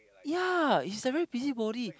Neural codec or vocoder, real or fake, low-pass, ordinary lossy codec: none; real; none; none